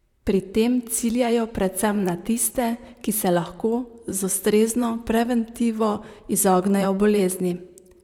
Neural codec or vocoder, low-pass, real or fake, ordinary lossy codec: vocoder, 44.1 kHz, 128 mel bands, Pupu-Vocoder; 19.8 kHz; fake; none